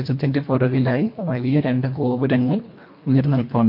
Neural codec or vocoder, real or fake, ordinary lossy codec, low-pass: codec, 24 kHz, 1.5 kbps, HILCodec; fake; MP3, 32 kbps; 5.4 kHz